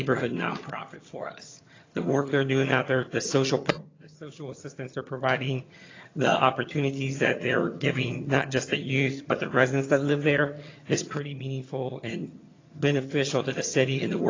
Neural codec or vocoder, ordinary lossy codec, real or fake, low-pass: vocoder, 22.05 kHz, 80 mel bands, HiFi-GAN; AAC, 32 kbps; fake; 7.2 kHz